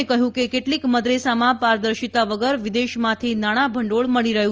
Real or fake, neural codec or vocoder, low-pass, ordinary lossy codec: real; none; 7.2 kHz; Opus, 24 kbps